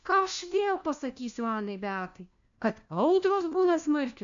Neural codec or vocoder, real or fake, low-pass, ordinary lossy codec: codec, 16 kHz, 1 kbps, FunCodec, trained on LibriTTS, 50 frames a second; fake; 7.2 kHz; MP3, 64 kbps